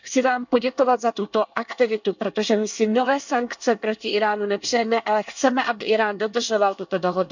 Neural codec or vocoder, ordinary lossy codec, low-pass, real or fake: codec, 24 kHz, 1 kbps, SNAC; none; 7.2 kHz; fake